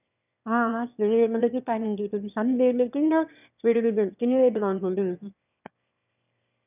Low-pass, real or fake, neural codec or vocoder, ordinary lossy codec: 3.6 kHz; fake; autoencoder, 22.05 kHz, a latent of 192 numbers a frame, VITS, trained on one speaker; none